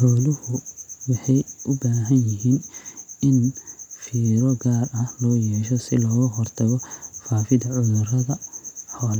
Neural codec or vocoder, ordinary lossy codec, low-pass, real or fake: none; none; 19.8 kHz; real